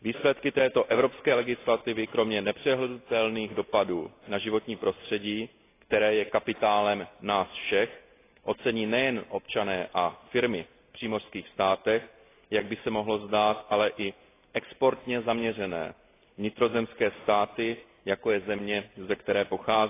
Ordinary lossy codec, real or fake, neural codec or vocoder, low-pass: AAC, 24 kbps; real; none; 3.6 kHz